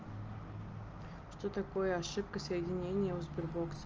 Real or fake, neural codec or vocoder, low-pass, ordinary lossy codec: real; none; 7.2 kHz; Opus, 24 kbps